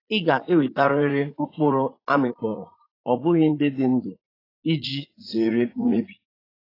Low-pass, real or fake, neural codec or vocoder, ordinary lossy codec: 5.4 kHz; fake; vocoder, 44.1 kHz, 80 mel bands, Vocos; AAC, 24 kbps